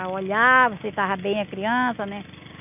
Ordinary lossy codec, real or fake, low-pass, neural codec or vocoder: none; real; 3.6 kHz; none